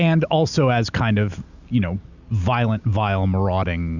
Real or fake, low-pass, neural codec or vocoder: real; 7.2 kHz; none